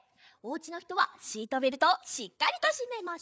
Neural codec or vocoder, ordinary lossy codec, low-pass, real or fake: codec, 16 kHz, 8 kbps, FreqCodec, larger model; none; none; fake